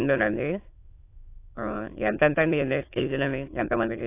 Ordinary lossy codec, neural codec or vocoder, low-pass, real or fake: AAC, 24 kbps; autoencoder, 22.05 kHz, a latent of 192 numbers a frame, VITS, trained on many speakers; 3.6 kHz; fake